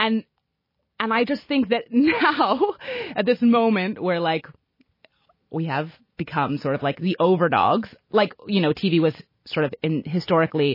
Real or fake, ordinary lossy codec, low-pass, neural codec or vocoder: real; MP3, 24 kbps; 5.4 kHz; none